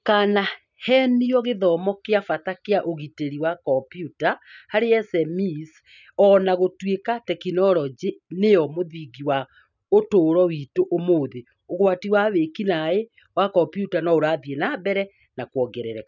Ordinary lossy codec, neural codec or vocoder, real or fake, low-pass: none; none; real; 7.2 kHz